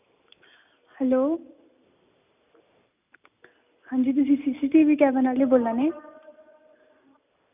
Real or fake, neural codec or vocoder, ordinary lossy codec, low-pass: real; none; none; 3.6 kHz